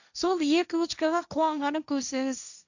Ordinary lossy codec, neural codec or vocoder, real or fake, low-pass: none; codec, 16 kHz, 1.1 kbps, Voila-Tokenizer; fake; 7.2 kHz